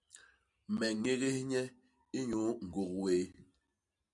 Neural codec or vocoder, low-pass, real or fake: none; 10.8 kHz; real